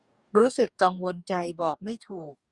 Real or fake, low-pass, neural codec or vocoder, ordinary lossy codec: fake; 10.8 kHz; codec, 44.1 kHz, 2.6 kbps, DAC; Opus, 64 kbps